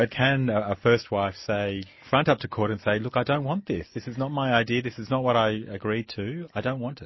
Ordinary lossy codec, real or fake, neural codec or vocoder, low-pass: MP3, 24 kbps; real; none; 7.2 kHz